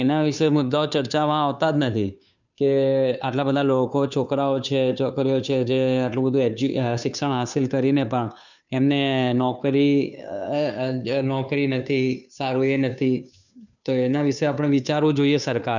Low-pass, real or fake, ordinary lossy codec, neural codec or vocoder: 7.2 kHz; fake; none; codec, 16 kHz, 2 kbps, FunCodec, trained on Chinese and English, 25 frames a second